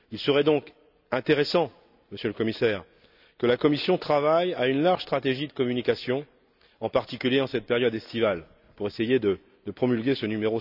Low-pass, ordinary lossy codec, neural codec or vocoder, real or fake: 5.4 kHz; none; none; real